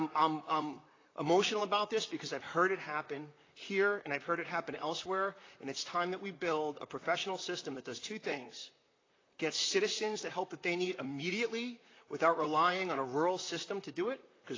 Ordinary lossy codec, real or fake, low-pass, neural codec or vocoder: AAC, 32 kbps; fake; 7.2 kHz; vocoder, 44.1 kHz, 128 mel bands, Pupu-Vocoder